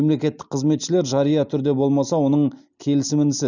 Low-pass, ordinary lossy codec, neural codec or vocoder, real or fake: 7.2 kHz; none; none; real